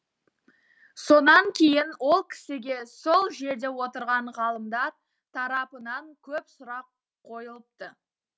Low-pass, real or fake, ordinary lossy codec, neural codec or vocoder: none; real; none; none